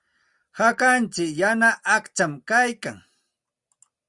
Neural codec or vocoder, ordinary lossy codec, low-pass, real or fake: none; Opus, 64 kbps; 10.8 kHz; real